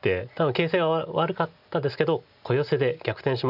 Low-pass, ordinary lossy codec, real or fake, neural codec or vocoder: 5.4 kHz; none; real; none